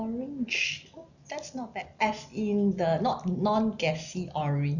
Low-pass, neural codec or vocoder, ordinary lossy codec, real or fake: 7.2 kHz; none; none; real